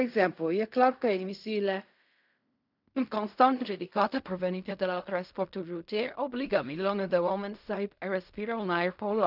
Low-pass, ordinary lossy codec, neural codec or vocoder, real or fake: 5.4 kHz; none; codec, 16 kHz in and 24 kHz out, 0.4 kbps, LongCat-Audio-Codec, fine tuned four codebook decoder; fake